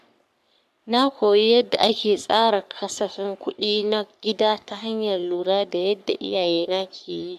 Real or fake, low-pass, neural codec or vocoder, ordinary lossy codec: fake; 14.4 kHz; codec, 44.1 kHz, 3.4 kbps, Pupu-Codec; AAC, 96 kbps